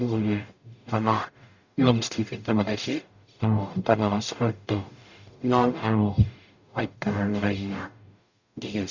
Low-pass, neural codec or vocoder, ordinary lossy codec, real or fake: 7.2 kHz; codec, 44.1 kHz, 0.9 kbps, DAC; none; fake